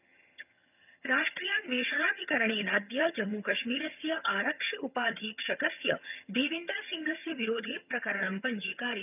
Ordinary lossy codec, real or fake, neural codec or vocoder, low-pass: none; fake; vocoder, 22.05 kHz, 80 mel bands, HiFi-GAN; 3.6 kHz